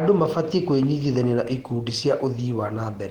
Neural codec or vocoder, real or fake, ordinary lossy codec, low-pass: none; real; Opus, 16 kbps; 19.8 kHz